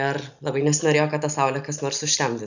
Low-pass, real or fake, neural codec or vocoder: 7.2 kHz; real; none